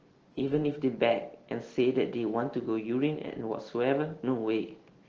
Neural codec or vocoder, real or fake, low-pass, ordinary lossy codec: none; real; 7.2 kHz; Opus, 16 kbps